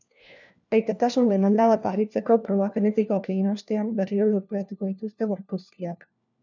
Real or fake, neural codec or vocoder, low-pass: fake; codec, 16 kHz, 1 kbps, FunCodec, trained on LibriTTS, 50 frames a second; 7.2 kHz